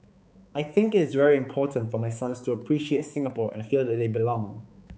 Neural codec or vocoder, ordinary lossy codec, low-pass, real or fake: codec, 16 kHz, 4 kbps, X-Codec, HuBERT features, trained on balanced general audio; none; none; fake